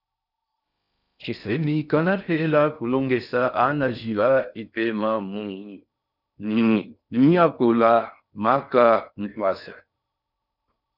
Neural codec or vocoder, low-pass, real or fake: codec, 16 kHz in and 24 kHz out, 0.6 kbps, FocalCodec, streaming, 4096 codes; 5.4 kHz; fake